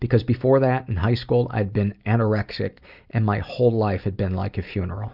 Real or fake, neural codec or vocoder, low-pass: real; none; 5.4 kHz